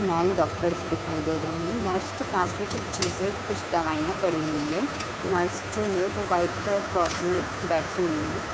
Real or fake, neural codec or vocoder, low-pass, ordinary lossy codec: fake; codec, 16 kHz, 2 kbps, FunCodec, trained on Chinese and English, 25 frames a second; none; none